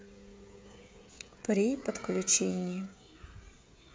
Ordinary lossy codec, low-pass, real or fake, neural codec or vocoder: none; none; fake; codec, 16 kHz, 16 kbps, FreqCodec, smaller model